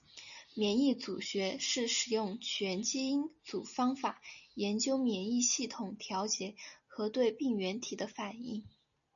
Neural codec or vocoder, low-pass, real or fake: none; 7.2 kHz; real